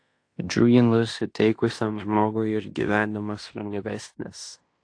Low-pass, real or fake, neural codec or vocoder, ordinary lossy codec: 9.9 kHz; fake; codec, 16 kHz in and 24 kHz out, 0.9 kbps, LongCat-Audio-Codec, fine tuned four codebook decoder; AAC, 48 kbps